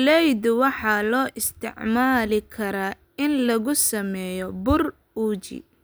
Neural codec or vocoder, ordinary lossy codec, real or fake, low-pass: none; none; real; none